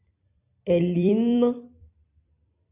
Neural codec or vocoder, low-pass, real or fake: vocoder, 44.1 kHz, 128 mel bands every 256 samples, BigVGAN v2; 3.6 kHz; fake